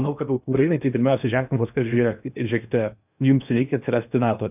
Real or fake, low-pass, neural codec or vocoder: fake; 3.6 kHz; codec, 16 kHz in and 24 kHz out, 0.8 kbps, FocalCodec, streaming, 65536 codes